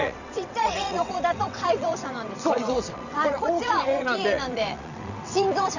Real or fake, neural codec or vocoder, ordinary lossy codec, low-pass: fake; vocoder, 22.05 kHz, 80 mel bands, WaveNeXt; none; 7.2 kHz